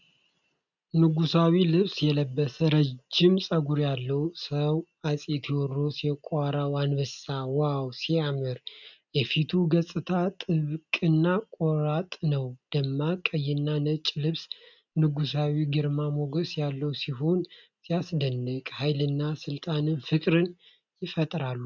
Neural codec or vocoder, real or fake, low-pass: none; real; 7.2 kHz